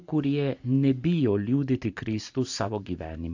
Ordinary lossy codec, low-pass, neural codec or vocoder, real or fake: AAC, 48 kbps; 7.2 kHz; vocoder, 44.1 kHz, 128 mel bands every 512 samples, BigVGAN v2; fake